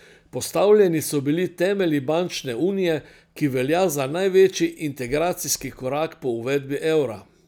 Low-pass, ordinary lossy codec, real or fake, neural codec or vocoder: none; none; real; none